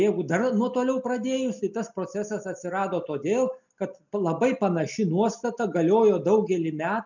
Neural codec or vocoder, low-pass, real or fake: none; 7.2 kHz; real